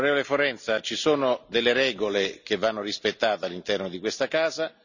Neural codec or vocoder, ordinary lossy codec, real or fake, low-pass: none; none; real; 7.2 kHz